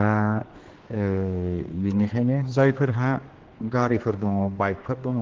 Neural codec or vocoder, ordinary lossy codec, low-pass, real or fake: codec, 16 kHz, 2 kbps, X-Codec, HuBERT features, trained on general audio; Opus, 16 kbps; 7.2 kHz; fake